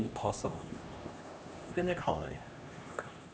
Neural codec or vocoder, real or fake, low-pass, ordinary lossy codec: codec, 16 kHz, 1 kbps, X-Codec, HuBERT features, trained on LibriSpeech; fake; none; none